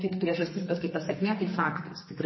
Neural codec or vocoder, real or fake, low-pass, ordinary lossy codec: codec, 44.1 kHz, 2.6 kbps, SNAC; fake; 7.2 kHz; MP3, 24 kbps